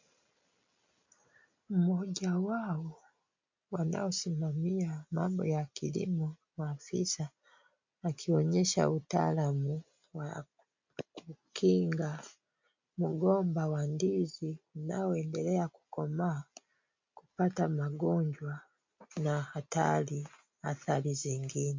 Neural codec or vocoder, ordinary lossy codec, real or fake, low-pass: none; MP3, 48 kbps; real; 7.2 kHz